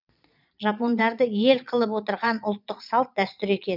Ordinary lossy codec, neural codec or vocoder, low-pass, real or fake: none; vocoder, 22.05 kHz, 80 mel bands, Vocos; 5.4 kHz; fake